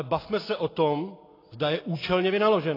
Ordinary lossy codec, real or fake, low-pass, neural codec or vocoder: AAC, 24 kbps; real; 5.4 kHz; none